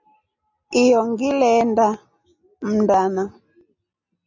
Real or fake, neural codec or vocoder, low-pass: real; none; 7.2 kHz